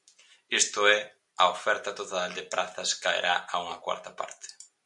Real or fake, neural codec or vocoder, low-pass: real; none; 10.8 kHz